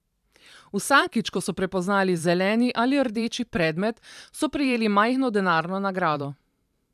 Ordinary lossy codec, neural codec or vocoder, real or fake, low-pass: none; vocoder, 44.1 kHz, 128 mel bands every 512 samples, BigVGAN v2; fake; 14.4 kHz